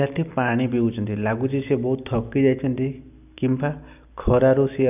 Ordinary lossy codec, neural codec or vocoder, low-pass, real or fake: none; none; 3.6 kHz; real